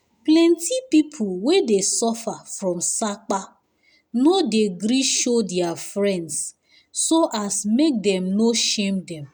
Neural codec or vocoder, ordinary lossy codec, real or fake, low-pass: none; none; real; none